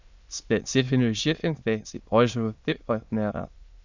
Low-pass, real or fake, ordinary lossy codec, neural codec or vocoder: 7.2 kHz; fake; Opus, 64 kbps; autoencoder, 22.05 kHz, a latent of 192 numbers a frame, VITS, trained on many speakers